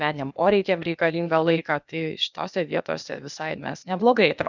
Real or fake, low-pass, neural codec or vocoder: fake; 7.2 kHz; codec, 16 kHz, 0.8 kbps, ZipCodec